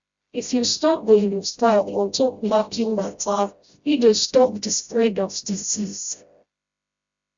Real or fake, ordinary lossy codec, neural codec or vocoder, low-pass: fake; Opus, 64 kbps; codec, 16 kHz, 0.5 kbps, FreqCodec, smaller model; 7.2 kHz